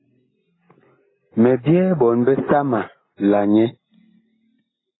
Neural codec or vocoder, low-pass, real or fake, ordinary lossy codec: none; 7.2 kHz; real; AAC, 16 kbps